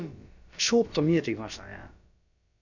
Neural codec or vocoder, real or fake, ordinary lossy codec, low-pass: codec, 16 kHz, about 1 kbps, DyCAST, with the encoder's durations; fake; none; 7.2 kHz